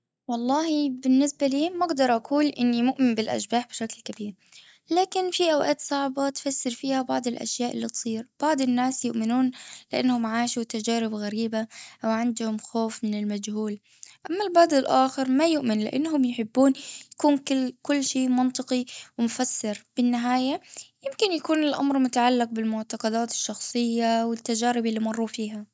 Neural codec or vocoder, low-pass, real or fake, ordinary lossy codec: none; none; real; none